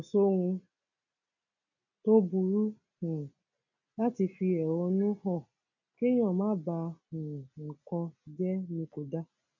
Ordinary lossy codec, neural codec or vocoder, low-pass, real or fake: none; none; 7.2 kHz; real